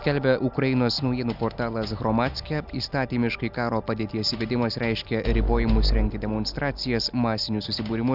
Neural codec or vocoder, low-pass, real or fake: none; 5.4 kHz; real